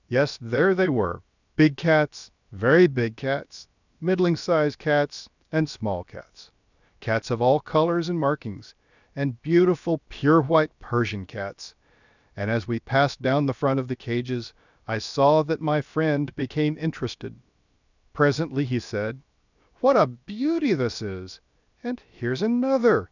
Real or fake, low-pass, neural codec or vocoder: fake; 7.2 kHz; codec, 16 kHz, 0.7 kbps, FocalCodec